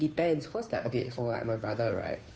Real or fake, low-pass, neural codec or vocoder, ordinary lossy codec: fake; none; codec, 16 kHz, 2 kbps, FunCodec, trained on Chinese and English, 25 frames a second; none